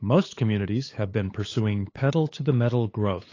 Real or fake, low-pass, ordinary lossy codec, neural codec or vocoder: fake; 7.2 kHz; AAC, 32 kbps; codec, 16 kHz, 8 kbps, FunCodec, trained on LibriTTS, 25 frames a second